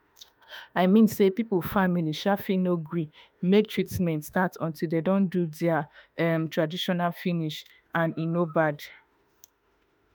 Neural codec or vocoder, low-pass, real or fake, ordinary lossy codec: autoencoder, 48 kHz, 32 numbers a frame, DAC-VAE, trained on Japanese speech; none; fake; none